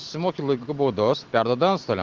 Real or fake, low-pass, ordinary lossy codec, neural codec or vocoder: real; 7.2 kHz; Opus, 16 kbps; none